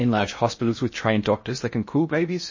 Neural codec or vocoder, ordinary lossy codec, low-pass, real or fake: codec, 16 kHz in and 24 kHz out, 0.6 kbps, FocalCodec, streaming, 2048 codes; MP3, 32 kbps; 7.2 kHz; fake